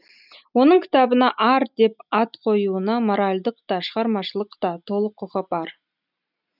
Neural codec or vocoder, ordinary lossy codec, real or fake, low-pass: none; none; real; 5.4 kHz